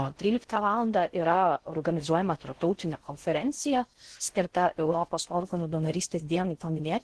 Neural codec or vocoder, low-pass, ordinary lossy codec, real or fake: codec, 16 kHz in and 24 kHz out, 0.6 kbps, FocalCodec, streaming, 4096 codes; 10.8 kHz; Opus, 16 kbps; fake